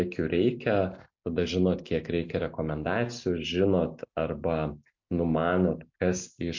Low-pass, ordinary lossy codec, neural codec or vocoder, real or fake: 7.2 kHz; MP3, 48 kbps; none; real